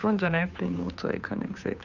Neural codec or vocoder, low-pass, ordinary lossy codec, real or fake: codec, 16 kHz, 2 kbps, FunCodec, trained on Chinese and English, 25 frames a second; 7.2 kHz; none; fake